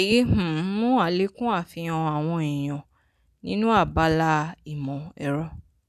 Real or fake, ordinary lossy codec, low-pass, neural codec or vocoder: real; none; 14.4 kHz; none